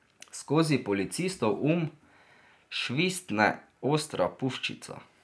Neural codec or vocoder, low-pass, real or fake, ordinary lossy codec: none; none; real; none